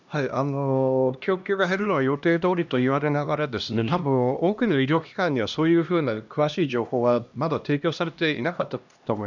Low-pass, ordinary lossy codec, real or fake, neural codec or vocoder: 7.2 kHz; none; fake; codec, 16 kHz, 1 kbps, X-Codec, HuBERT features, trained on LibriSpeech